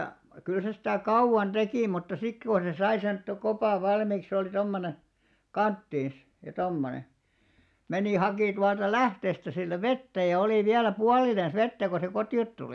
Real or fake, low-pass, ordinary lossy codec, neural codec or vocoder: real; none; none; none